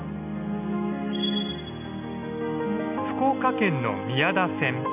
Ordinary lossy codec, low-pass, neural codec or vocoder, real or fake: none; 3.6 kHz; none; real